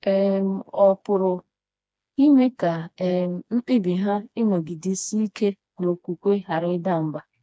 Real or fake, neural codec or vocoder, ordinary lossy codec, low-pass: fake; codec, 16 kHz, 2 kbps, FreqCodec, smaller model; none; none